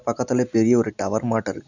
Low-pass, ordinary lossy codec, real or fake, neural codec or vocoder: 7.2 kHz; none; real; none